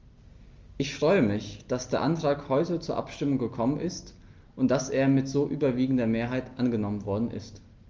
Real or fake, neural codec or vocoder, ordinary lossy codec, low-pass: real; none; Opus, 32 kbps; 7.2 kHz